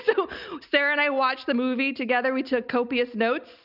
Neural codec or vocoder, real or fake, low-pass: none; real; 5.4 kHz